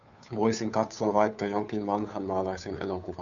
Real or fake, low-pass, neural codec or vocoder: fake; 7.2 kHz; codec, 16 kHz, 2 kbps, FunCodec, trained on Chinese and English, 25 frames a second